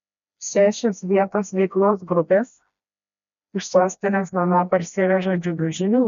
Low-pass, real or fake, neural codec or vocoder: 7.2 kHz; fake; codec, 16 kHz, 1 kbps, FreqCodec, smaller model